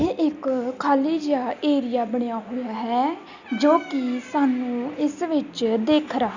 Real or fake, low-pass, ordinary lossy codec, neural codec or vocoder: real; 7.2 kHz; Opus, 64 kbps; none